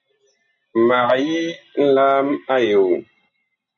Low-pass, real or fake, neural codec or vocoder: 7.2 kHz; real; none